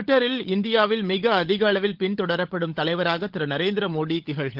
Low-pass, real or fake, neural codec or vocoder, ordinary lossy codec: 5.4 kHz; fake; codec, 16 kHz, 16 kbps, FunCodec, trained on LibriTTS, 50 frames a second; Opus, 16 kbps